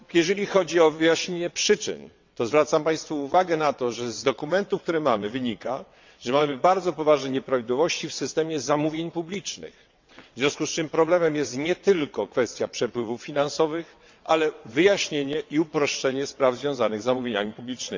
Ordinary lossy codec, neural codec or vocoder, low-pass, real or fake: MP3, 64 kbps; vocoder, 22.05 kHz, 80 mel bands, WaveNeXt; 7.2 kHz; fake